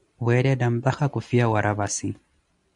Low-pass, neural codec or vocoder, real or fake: 10.8 kHz; none; real